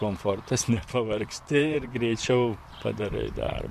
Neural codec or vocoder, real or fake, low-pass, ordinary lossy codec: vocoder, 44.1 kHz, 128 mel bands, Pupu-Vocoder; fake; 14.4 kHz; MP3, 64 kbps